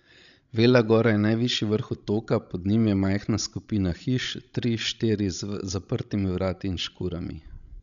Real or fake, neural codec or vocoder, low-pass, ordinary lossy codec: fake; codec, 16 kHz, 16 kbps, FreqCodec, larger model; 7.2 kHz; none